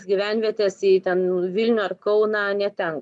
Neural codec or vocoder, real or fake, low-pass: none; real; 10.8 kHz